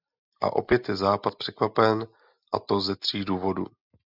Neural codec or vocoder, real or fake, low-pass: none; real; 5.4 kHz